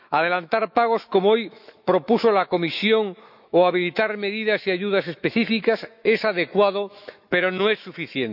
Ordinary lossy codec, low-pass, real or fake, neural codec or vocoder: none; 5.4 kHz; fake; autoencoder, 48 kHz, 128 numbers a frame, DAC-VAE, trained on Japanese speech